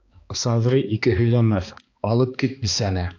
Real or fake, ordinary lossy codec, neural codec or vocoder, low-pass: fake; AAC, 48 kbps; codec, 16 kHz, 2 kbps, X-Codec, HuBERT features, trained on balanced general audio; 7.2 kHz